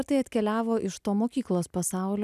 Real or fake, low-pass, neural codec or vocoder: real; 14.4 kHz; none